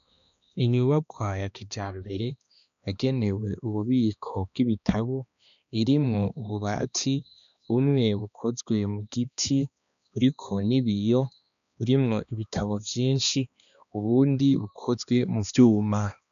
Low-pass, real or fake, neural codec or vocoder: 7.2 kHz; fake; codec, 16 kHz, 2 kbps, X-Codec, HuBERT features, trained on balanced general audio